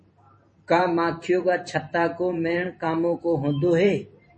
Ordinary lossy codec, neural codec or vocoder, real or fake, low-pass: MP3, 32 kbps; vocoder, 44.1 kHz, 128 mel bands every 512 samples, BigVGAN v2; fake; 10.8 kHz